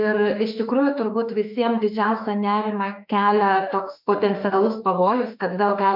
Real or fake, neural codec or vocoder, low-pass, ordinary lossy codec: fake; autoencoder, 48 kHz, 32 numbers a frame, DAC-VAE, trained on Japanese speech; 5.4 kHz; MP3, 48 kbps